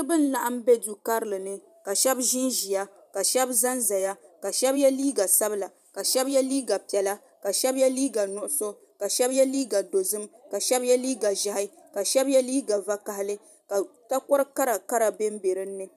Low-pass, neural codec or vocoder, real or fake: 14.4 kHz; vocoder, 44.1 kHz, 128 mel bands every 512 samples, BigVGAN v2; fake